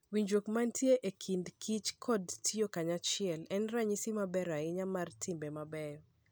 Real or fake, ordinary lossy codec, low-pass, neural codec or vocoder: real; none; none; none